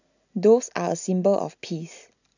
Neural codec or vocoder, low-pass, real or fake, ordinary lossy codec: none; 7.2 kHz; real; none